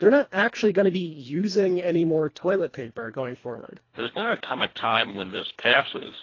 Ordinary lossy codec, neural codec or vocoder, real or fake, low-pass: AAC, 32 kbps; codec, 24 kHz, 1.5 kbps, HILCodec; fake; 7.2 kHz